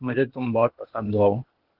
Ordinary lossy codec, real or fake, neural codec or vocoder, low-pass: Opus, 16 kbps; fake; codec, 16 kHz, 0.8 kbps, ZipCodec; 5.4 kHz